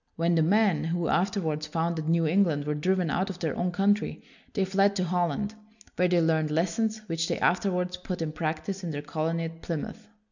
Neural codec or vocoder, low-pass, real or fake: none; 7.2 kHz; real